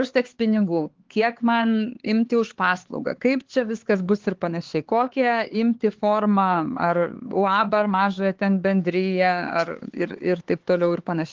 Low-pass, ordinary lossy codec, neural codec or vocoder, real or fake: 7.2 kHz; Opus, 16 kbps; codec, 16 kHz, 4 kbps, X-Codec, HuBERT features, trained on LibriSpeech; fake